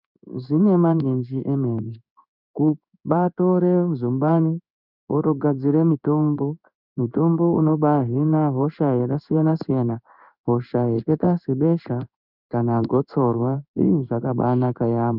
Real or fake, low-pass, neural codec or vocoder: fake; 5.4 kHz; codec, 16 kHz in and 24 kHz out, 1 kbps, XY-Tokenizer